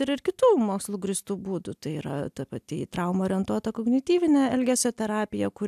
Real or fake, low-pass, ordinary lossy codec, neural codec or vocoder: real; 14.4 kHz; AAC, 96 kbps; none